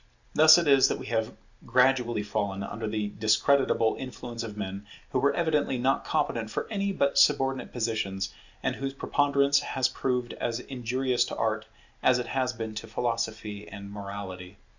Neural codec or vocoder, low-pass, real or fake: none; 7.2 kHz; real